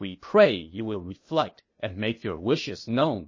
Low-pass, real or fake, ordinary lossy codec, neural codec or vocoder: 7.2 kHz; fake; MP3, 32 kbps; codec, 16 kHz, 0.8 kbps, ZipCodec